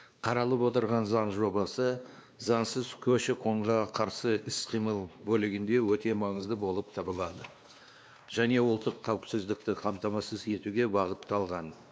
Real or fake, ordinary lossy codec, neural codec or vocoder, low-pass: fake; none; codec, 16 kHz, 2 kbps, X-Codec, WavLM features, trained on Multilingual LibriSpeech; none